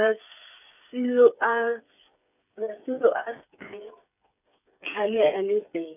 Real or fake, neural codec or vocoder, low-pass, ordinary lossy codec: fake; codec, 16 kHz, 8 kbps, FreqCodec, smaller model; 3.6 kHz; none